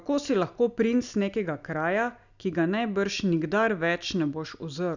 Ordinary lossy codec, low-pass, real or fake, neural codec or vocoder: none; 7.2 kHz; real; none